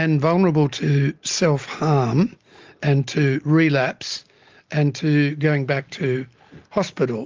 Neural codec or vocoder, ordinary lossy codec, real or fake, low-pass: none; Opus, 32 kbps; real; 7.2 kHz